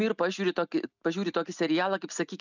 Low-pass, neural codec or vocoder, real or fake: 7.2 kHz; none; real